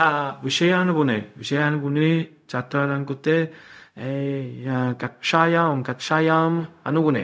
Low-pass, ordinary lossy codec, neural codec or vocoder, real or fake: none; none; codec, 16 kHz, 0.4 kbps, LongCat-Audio-Codec; fake